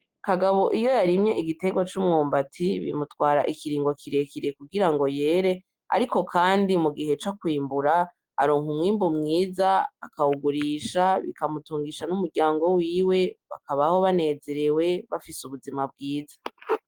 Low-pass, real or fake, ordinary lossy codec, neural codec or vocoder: 19.8 kHz; real; Opus, 24 kbps; none